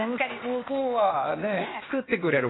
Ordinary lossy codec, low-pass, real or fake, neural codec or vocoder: AAC, 16 kbps; 7.2 kHz; fake; codec, 16 kHz, 0.8 kbps, ZipCodec